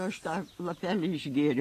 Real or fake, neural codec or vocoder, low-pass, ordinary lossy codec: real; none; 14.4 kHz; AAC, 48 kbps